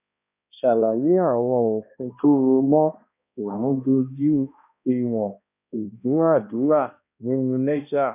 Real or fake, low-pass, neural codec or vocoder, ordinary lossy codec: fake; 3.6 kHz; codec, 16 kHz, 1 kbps, X-Codec, HuBERT features, trained on balanced general audio; none